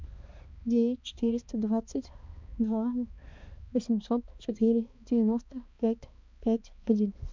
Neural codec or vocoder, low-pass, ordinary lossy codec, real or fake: codec, 16 kHz, 2 kbps, X-Codec, HuBERT features, trained on balanced general audio; 7.2 kHz; MP3, 64 kbps; fake